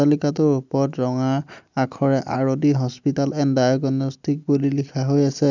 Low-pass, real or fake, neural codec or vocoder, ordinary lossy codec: 7.2 kHz; real; none; none